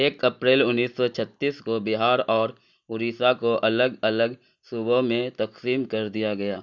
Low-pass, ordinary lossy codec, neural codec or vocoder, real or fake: 7.2 kHz; none; none; real